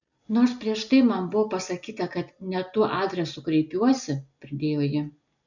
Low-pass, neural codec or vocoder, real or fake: 7.2 kHz; none; real